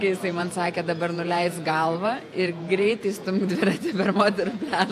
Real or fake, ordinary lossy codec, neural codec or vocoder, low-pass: fake; AAC, 64 kbps; vocoder, 48 kHz, 128 mel bands, Vocos; 14.4 kHz